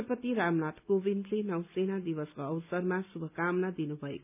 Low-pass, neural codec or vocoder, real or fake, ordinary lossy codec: 3.6 kHz; none; real; none